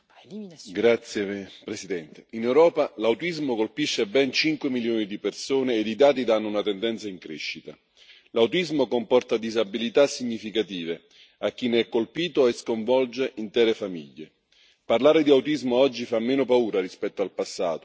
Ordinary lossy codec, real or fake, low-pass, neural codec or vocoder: none; real; none; none